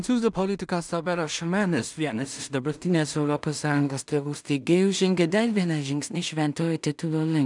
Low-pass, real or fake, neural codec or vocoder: 10.8 kHz; fake; codec, 16 kHz in and 24 kHz out, 0.4 kbps, LongCat-Audio-Codec, two codebook decoder